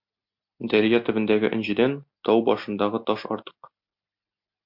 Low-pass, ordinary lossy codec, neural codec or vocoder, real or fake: 5.4 kHz; MP3, 48 kbps; none; real